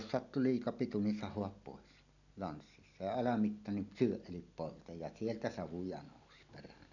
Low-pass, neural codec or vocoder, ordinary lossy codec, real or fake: 7.2 kHz; none; none; real